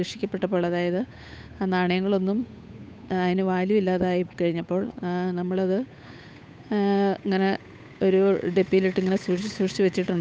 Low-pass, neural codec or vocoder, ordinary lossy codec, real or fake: none; codec, 16 kHz, 8 kbps, FunCodec, trained on Chinese and English, 25 frames a second; none; fake